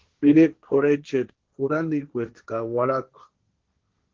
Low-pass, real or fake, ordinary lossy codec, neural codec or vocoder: 7.2 kHz; fake; Opus, 16 kbps; codec, 16 kHz, 1.1 kbps, Voila-Tokenizer